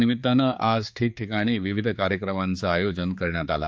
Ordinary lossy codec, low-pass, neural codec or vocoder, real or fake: none; none; codec, 16 kHz, 4 kbps, X-Codec, HuBERT features, trained on general audio; fake